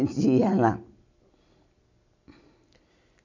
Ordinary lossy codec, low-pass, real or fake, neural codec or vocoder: none; 7.2 kHz; real; none